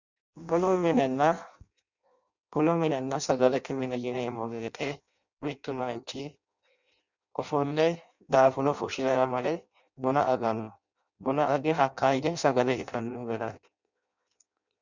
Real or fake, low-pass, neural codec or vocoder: fake; 7.2 kHz; codec, 16 kHz in and 24 kHz out, 0.6 kbps, FireRedTTS-2 codec